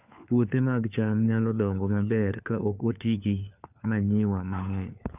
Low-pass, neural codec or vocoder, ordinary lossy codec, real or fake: 3.6 kHz; codec, 16 kHz, 4 kbps, FunCodec, trained on LibriTTS, 50 frames a second; none; fake